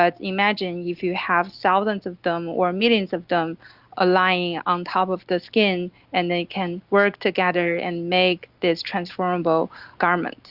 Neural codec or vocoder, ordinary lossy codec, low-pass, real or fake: none; Opus, 64 kbps; 5.4 kHz; real